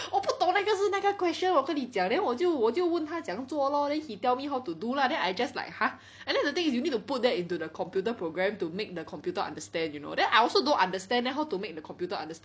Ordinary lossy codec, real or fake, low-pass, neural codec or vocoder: none; real; none; none